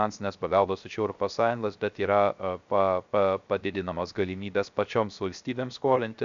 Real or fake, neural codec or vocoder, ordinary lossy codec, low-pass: fake; codec, 16 kHz, 0.3 kbps, FocalCodec; MP3, 64 kbps; 7.2 kHz